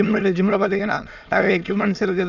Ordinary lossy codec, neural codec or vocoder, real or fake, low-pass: none; autoencoder, 22.05 kHz, a latent of 192 numbers a frame, VITS, trained on many speakers; fake; 7.2 kHz